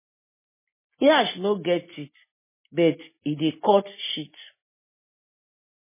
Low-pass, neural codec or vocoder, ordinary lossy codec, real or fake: 3.6 kHz; none; MP3, 16 kbps; real